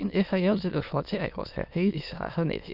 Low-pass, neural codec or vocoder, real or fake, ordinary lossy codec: 5.4 kHz; autoencoder, 22.05 kHz, a latent of 192 numbers a frame, VITS, trained on many speakers; fake; none